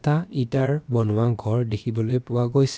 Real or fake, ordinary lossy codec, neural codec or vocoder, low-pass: fake; none; codec, 16 kHz, about 1 kbps, DyCAST, with the encoder's durations; none